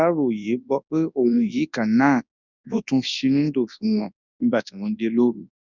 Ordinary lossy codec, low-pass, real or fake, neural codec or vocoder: none; 7.2 kHz; fake; codec, 24 kHz, 0.9 kbps, WavTokenizer, large speech release